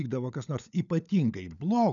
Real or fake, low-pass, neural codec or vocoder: fake; 7.2 kHz; codec, 16 kHz, 16 kbps, FunCodec, trained on LibriTTS, 50 frames a second